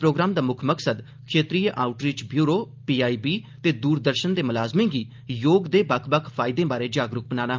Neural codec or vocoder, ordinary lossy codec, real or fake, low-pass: none; Opus, 24 kbps; real; 7.2 kHz